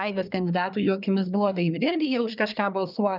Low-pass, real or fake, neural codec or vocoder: 5.4 kHz; fake; codec, 24 kHz, 1 kbps, SNAC